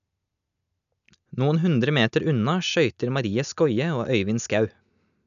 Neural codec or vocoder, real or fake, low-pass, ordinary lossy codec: none; real; 7.2 kHz; none